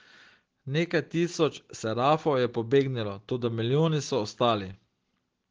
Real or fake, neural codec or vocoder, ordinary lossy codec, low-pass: real; none; Opus, 16 kbps; 7.2 kHz